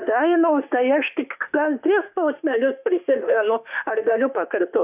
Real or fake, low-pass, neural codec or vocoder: fake; 3.6 kHz; autoencoder, 48 kHz, 32 numbers a frame, DAC-VAE, trained on Japanese speech